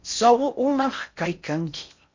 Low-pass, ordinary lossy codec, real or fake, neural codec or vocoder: 7.2 kHz; MP3, 48 kbps; fake; codec, 16 kHz in and 24 kHz out, 0.6 kbps, FocalCodec, streaming, 4096 codes